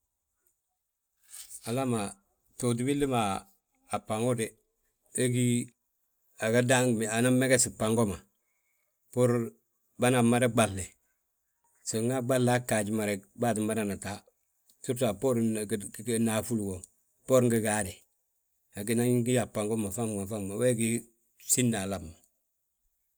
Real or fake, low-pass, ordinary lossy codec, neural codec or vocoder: real; none; none; none